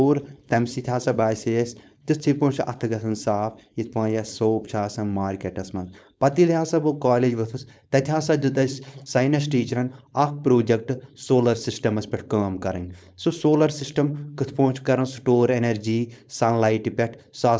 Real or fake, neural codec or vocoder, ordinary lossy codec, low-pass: fake; codec, 16 kHz, 4.8 kbps, FACodec; none; none